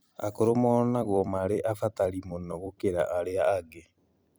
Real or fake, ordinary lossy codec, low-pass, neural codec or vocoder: fake; none; none; vocoder, 44.1 kHz, 128 mel bands every 256 samples, BigVGAN v2